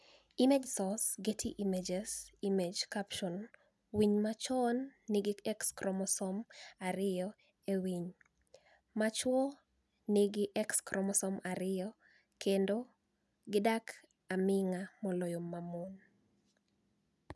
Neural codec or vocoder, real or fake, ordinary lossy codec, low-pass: none; real; none; none